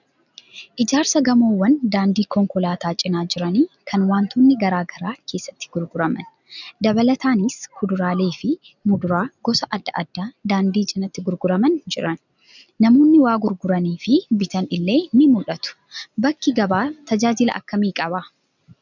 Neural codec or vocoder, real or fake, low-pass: none; real; 7.2 kHz